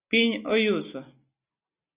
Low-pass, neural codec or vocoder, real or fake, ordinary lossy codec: 3.6 kHz; none; real; Opus, 64 kbps